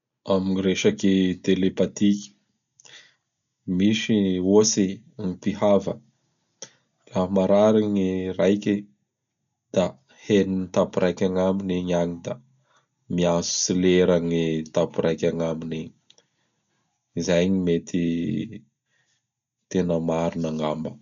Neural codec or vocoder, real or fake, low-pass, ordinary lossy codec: none; real; 7.2 kHz; none